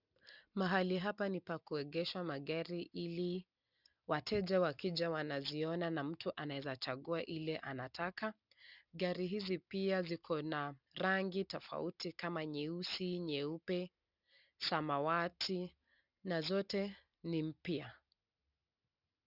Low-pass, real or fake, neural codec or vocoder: 5.4 kHz; real; none